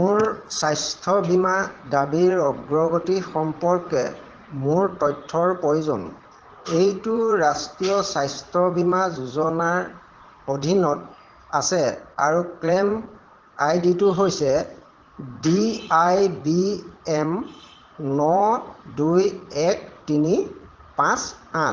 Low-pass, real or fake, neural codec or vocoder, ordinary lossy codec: 7.2 kHz; fake; vocoder, 44.1 kHz, 80 mel bands, Vocos; Opus, 16 kbps